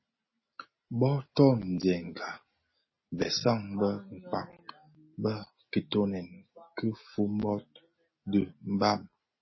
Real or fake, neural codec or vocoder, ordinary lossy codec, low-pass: real; none; MP3, 24 kbps; 7.2 kHz